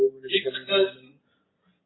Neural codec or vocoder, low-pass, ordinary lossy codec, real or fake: none; 7.2 kHz; AAC, 16 kbps; real